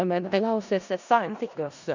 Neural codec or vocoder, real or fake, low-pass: codec, 16 kHz in and 24 kHz out, 0.4 kbps, LongCat-Audio-Codec, four codebook decoder; fake; 7.2 kHz